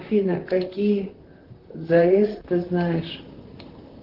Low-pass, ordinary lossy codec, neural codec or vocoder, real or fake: 5.4 kHz; Opus, 16 kbps; vocoder, 44.1 kHz, 128 mel bands, Pupu-Vocoder; fake